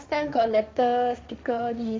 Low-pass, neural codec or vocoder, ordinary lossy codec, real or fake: none; codec, 16 kHz, 1.1 kbps, Voila-Tokenizer; none; fake